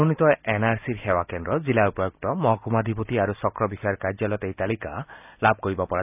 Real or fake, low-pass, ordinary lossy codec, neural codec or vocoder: real; 3.6 kHz; none; none